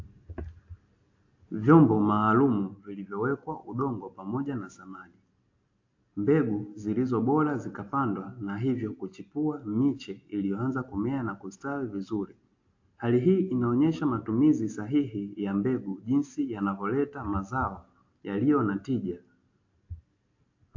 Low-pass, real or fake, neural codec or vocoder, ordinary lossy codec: 7.2 kHz; real; none; AAC, 48 kbps